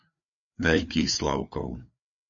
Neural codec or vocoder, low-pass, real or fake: codec, 16 kHz, 4 kbps, FreqCodec, larger model; 7.2 kHz; fake